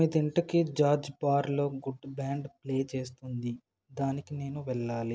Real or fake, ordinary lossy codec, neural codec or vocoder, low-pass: real; none; none; none